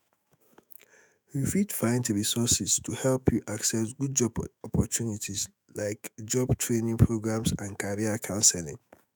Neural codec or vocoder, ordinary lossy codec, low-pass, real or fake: autoencoder, 48 kHz, 128 numbers a frame, DAC-VAE, trained on Japanese speech; none; none; fake